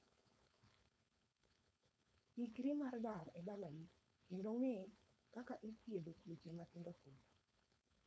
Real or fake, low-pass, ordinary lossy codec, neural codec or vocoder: fake; none; none; codec, 16 kHz, 4.8 kbps, FACodec